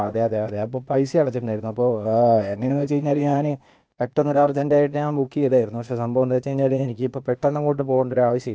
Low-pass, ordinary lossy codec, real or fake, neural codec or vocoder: none; none; fake; codec, 16 kHz, 0.8 kbps, ZipCodec